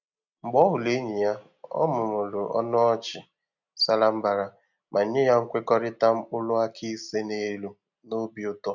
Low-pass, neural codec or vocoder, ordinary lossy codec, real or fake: 7.2 kHz; none; none; real